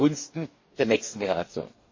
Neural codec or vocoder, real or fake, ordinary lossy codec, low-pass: codec, 44.1 kHz, 2.6 kbps, DAC; fake; MP3, 32 kbps; 7.2 kHz